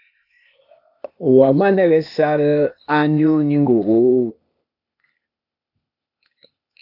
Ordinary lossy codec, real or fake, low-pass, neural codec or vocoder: AAC, 48 kbps; fake; 5.4 kHz; codec, 16 kHz, 0.8 kbps, ZipCodec